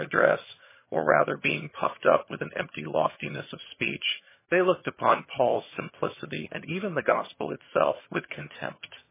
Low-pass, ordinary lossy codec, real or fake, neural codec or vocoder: 3.6 kHz; MP3, 16 kbps; fake; vocoder, 22.05 kHz, 80 mel bands, HiFi-GAN